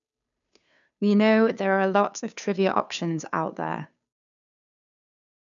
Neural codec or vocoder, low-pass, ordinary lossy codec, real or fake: codec, 16 kHz, 2 kbps, FunCodec, trained on Chinese and English, 25 frames a second; 7.2 kHz; none; fake